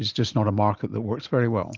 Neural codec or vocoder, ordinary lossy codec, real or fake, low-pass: none; Opus, 24 kbps; real; 7.2 kHz